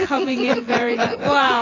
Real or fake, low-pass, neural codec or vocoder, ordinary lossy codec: fake; 7.2 kHz; vocoder, 24 kHz, 100 mel bands, Vocos; AAC, 32 kbps